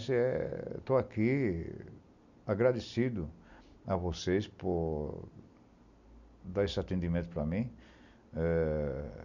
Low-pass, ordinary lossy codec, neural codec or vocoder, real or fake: 7.2 kHz; none; none; real